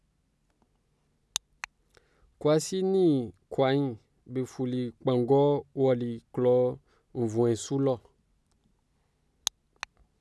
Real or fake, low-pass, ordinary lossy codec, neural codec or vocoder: real; none; none; none